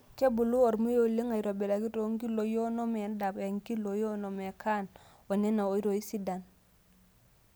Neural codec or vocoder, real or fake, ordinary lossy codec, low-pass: none; real; none; none